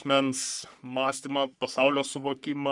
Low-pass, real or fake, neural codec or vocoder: 10.8 kHz; fake; codec, 44.1 kHz, 3.4 kbps, Pupu-Codec